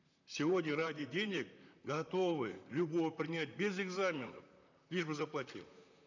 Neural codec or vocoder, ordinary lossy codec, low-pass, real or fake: vocoder, 44.1 kHz, 128 mel bands, Pupu-Vocoder; none; 7.2 kHz; fake